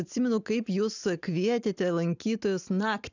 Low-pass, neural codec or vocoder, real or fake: 7.2 kHz; none; real